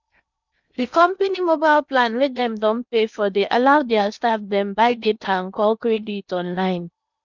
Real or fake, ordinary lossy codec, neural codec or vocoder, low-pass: fake; none; codec, 16 kHz in and 24 kHz out, 0.8 kbps, FocalCodec, streaming, 65536 codes; 7.2 kHz